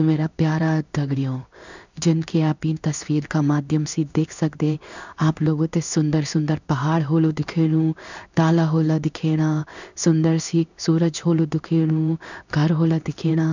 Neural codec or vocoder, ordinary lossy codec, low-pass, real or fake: codec, 16 kHz in and 24 kHz out, 1 kbps, XY-Tokenizer; none; 7.2 kHz; fake